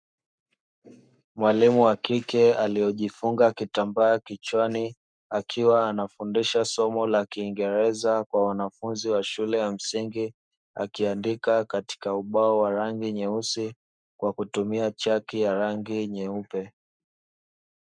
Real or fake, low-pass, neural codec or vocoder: fake; 9.9 kHz; codec, 44.1 kHz, 7.8 kbps, Pupu-Codec